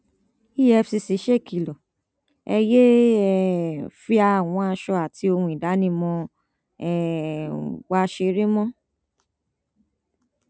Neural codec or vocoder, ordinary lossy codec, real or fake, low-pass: none; none; real; none